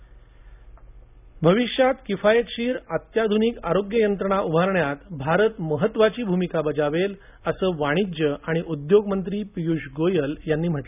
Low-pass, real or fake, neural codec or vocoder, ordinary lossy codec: 3.6 kHz; real; none; none